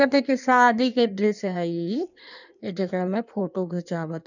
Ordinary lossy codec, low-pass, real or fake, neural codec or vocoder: none; 7.2 kHz; fake; codec, 16 kHz in and 24 kHz out, 1.1 kbps, FireRedTTS-2 codec